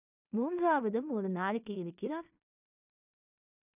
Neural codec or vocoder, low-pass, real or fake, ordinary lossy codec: codec, 16 kHz in and 24 kHz out, 0.4 kbps, LongCat-Audio-Codec, two codebook decoder; 3.6 kHz; fake; none